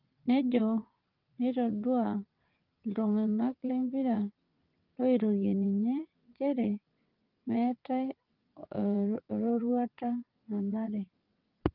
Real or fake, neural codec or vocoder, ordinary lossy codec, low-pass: fake; vocoder, 22.05 kHz, 80 mel bands, WaveNeXt; Opus, 32 kbps; 5.4 kHz